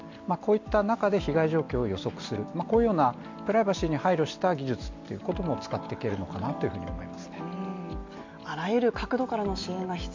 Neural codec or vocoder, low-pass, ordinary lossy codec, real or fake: none; 7.2 kHz; MP3, 64 kbps; real